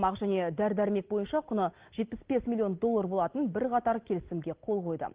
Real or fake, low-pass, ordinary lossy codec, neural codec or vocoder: real; 3.6 kHz; Opus, 16 kbps; none